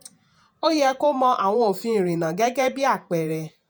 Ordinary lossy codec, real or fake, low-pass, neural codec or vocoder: none; fake; none; vocoder, 48 kHz, 128 mel bands, Vocos